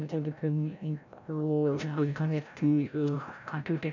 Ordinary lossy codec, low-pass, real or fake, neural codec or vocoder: none; 7.2 kHz; fake; codec, 16 kHz, 0.5 kbps, FreqCodec, larger model